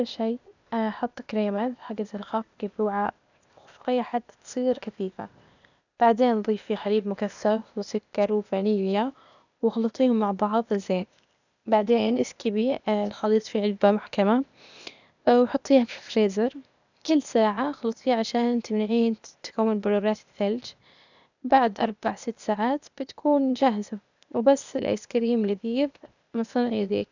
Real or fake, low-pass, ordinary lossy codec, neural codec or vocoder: fake; 7.2 kHz; none; codec, 16 kHz, 0.8 kbps, ZipCodec